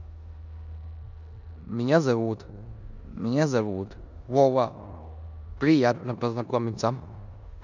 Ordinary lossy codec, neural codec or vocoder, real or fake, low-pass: none; codec, 16 kHz in and 24 kHz out, 0.9 kbps, LongCat-Audio-Codec, four codebook decoder; fake; 7.2 kHz